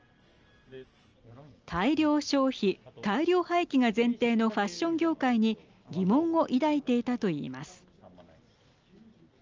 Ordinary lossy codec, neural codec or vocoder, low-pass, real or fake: Opus, 24 kbps; none; 7.2 kHz; real